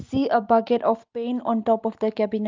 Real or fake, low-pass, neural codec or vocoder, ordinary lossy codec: real; 7.2 kHz; none; Opus, 32 kbps